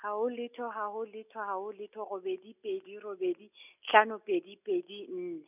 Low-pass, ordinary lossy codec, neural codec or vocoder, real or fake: 3.6 kHz; none; none; real